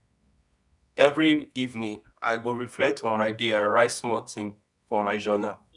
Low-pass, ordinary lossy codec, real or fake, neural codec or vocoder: 10.8 kHz; none; fake; codec, 24 kHz, 0.9 kbps, WavTokenizer, medium music audio release